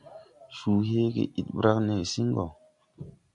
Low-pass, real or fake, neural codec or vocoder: 10.8 kHz; real; none